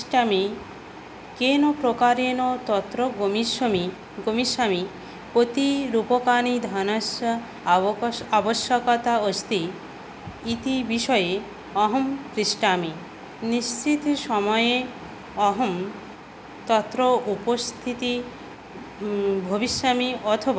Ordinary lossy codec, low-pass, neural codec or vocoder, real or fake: none; none; none; real